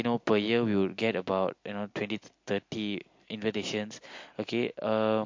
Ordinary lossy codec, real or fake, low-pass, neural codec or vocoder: MP3, 48 kbps; real; 7.2 kHz; none